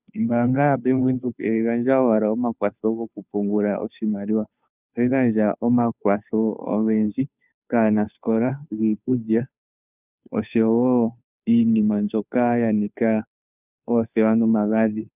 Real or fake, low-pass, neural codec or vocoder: fake; 3.6 kHz; codec, 16 kHz, 2 kbps, FunCodec, trained on Chinese and English, 25 frames a second